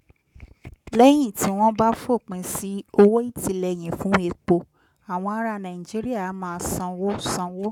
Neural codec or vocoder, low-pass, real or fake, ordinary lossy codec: codec, 44.1 kHz, 7.8 kbps, Pupu-Codec; 19.8 kHz; fake; none